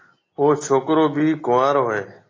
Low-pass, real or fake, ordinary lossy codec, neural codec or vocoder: 7.2 kHz; real; AAC, 32 kbps; none